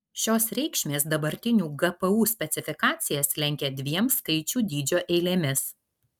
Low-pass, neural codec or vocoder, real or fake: 19.8 kHz; none; real